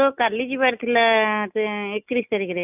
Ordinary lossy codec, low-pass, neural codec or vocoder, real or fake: none; 3.6 kHz; none; real